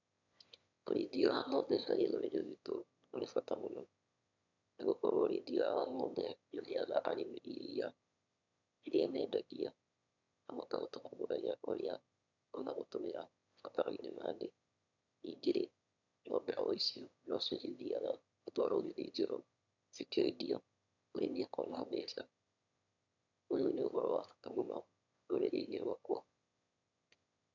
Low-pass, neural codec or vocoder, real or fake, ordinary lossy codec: 7.2 kHz; autoencoder, 22.05 kHz, a latent of 192 numbers a frame, VITS, trained on one speaker; fake; none